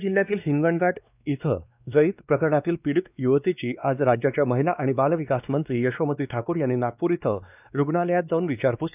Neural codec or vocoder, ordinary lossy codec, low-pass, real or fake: codec, 16 kHz, 2 kbps, X-Codec, WavLM features, trained on Multilingual LibriSpeech; none; 3.6 kHz; fake